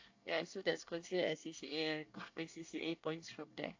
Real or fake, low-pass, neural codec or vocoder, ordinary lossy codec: fake; 7.2 kHz; codec, 24 kHz, 1 kbps, SNAC; AAC, 48 kbps